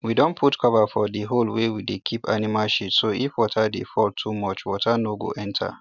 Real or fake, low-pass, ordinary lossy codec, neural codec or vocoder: real; 7.2 kHz; none; none